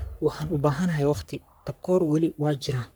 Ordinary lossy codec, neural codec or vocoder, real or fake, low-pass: none; codec, 44.1 kHz, 3.4 kbps, Pupu-Codec; fake; none